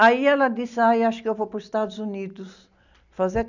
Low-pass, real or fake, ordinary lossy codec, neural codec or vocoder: 7.2 kHz; real; none; none